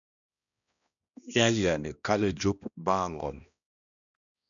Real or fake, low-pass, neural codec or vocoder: fake; 7.2 kHz; codec, 16 kHz, 1 kbps, X-Codec, HuBERT features, trained on balanced general audio